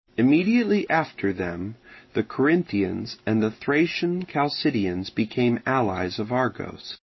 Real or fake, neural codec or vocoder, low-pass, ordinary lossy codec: real; none; 7.2 kHz; MP3, 24 kbps